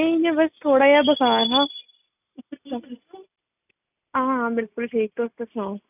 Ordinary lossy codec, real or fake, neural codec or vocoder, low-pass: none; real; none; 3.6 kHz